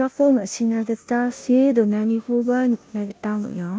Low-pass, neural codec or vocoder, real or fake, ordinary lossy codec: none; codec, 16 kHz, 0.5 kbps, FunCodec, trained on Chinese and English, 25 frames a second; fake; none